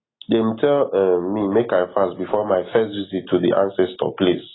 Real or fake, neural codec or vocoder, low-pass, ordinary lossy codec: real; none; 7.2 kHz; AAC, 16 kbps